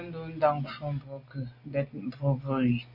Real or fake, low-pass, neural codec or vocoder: real; 5.4 kHz; none